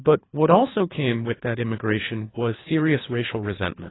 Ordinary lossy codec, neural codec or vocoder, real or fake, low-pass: AAC, 16 kbps; codec, 24 kHz, 3 kbps, HILCodec; fake; 7.2 kHz